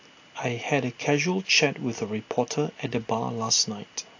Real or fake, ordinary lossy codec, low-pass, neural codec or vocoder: real; AAC, 48 kbps; 7.2 kHz; none